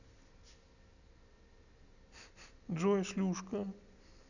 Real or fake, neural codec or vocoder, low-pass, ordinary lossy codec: real; none; 7.2 kHz; none